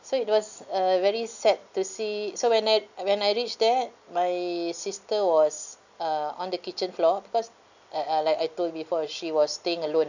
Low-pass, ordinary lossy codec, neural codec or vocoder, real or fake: 7.2 kHz; none; none; real